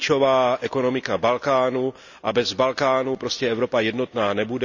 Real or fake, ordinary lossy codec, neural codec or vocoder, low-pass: real; none; none; 7.2 kHz